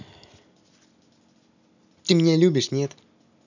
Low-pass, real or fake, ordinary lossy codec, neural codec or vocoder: 7.2 kHz; real; none; none